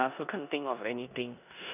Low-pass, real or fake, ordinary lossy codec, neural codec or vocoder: 3.6 kHz; fake; none; codec, 16 kHz in and 24 kHz out, 0.9 kbps, LongCat-Audio-Codec, four codebook decoder